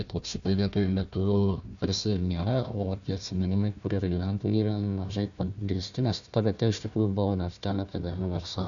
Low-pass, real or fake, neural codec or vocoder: 7.2 kHz; fake; codec, 16 kHz, 1 kbps, FunCodec, trained on Chinese and English, 50 frames a second